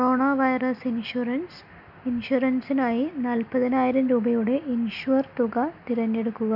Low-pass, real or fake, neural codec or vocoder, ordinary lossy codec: 5.4 kHz; real; none; none